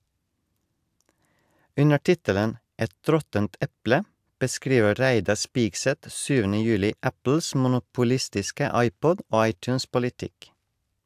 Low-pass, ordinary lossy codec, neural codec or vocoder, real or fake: 14.4 kHz; AAC, 96 kbps; none; real